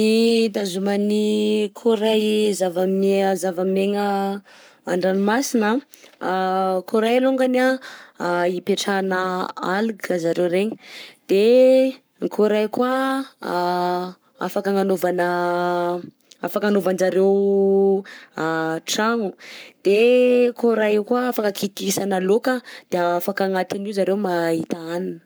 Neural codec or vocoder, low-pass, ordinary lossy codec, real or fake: codec, 44.1 kHz, 7.8 kbps, Pupu-Codec; none; none; fake